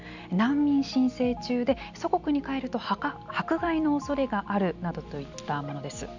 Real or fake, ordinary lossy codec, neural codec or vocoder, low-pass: real; none; none; 7.2 kHz